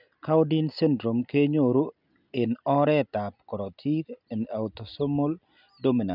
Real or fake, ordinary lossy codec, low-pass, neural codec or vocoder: real; none; 5.4 kHz; none